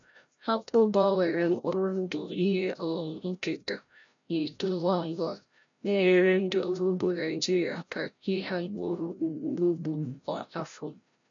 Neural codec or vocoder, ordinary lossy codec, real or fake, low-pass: codec, 16 kHz, 0.5 kbps, FreqCodec, larger model; none; fake; 7.2 kHz